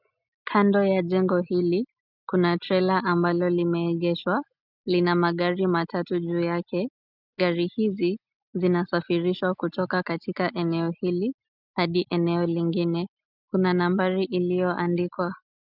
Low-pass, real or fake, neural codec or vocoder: 5.4 kHz; real; none